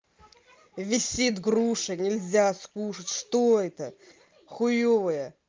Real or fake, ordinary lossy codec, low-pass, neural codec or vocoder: real; Opus, 24 kbps; 7.2 kHz; none